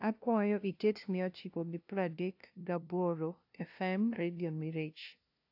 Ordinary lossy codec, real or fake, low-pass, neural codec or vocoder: AAC, 48 kbps; fake; 5.4 kHz; codec, 16 kHz, 0.5 kbps, FunCodec, trained on LibriTTS, 25 frames a second